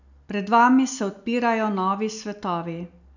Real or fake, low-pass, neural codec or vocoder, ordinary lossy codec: real; 7.2 kHz; none; none